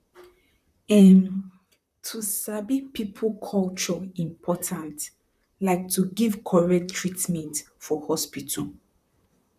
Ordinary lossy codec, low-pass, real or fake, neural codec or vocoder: none; 14.4 kHz; fake; vocoder, 44.1 kHz, 128 mel bands, Pupu-Vocoder